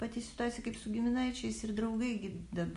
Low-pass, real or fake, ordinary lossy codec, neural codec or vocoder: 14.4 kHz; real; MP3, 48 kbps; none